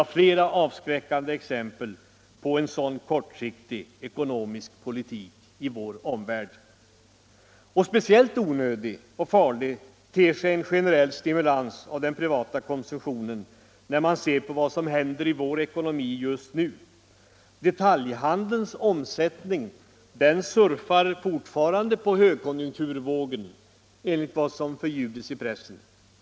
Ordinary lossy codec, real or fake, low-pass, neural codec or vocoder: none; real; none; none